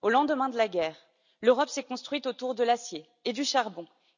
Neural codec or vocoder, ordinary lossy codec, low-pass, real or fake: none; none; 7.2 kHz; real